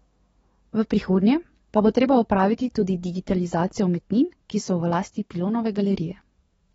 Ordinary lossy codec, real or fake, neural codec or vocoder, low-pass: AAC, 24 kbps; fake; codec, 44.1 kHz, 7.8 kbps, DAC; 19.8 kHz